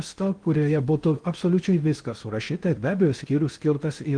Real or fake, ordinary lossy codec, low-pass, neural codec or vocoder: fake; Opus, 24 kbps; 9.9 kHz; codec, 16 kHz in and 24 kHz out, 0.6 kbps, FocalCodec, streaming, 2048 codes